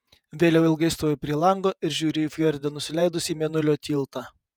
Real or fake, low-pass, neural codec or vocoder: fake; 19.8 kHz; vocoder, 48 kHz, 128 mel bands, Vocos